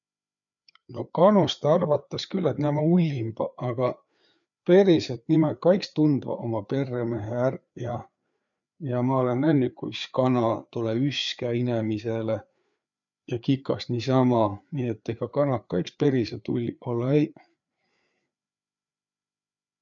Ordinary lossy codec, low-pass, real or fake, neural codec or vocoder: none; 7.2 kHz; fake; codec, 16 kHz, 4 kbps, FreqCodec, larger model